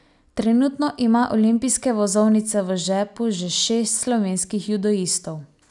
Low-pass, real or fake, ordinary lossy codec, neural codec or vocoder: 10.8 kHz; real; none; none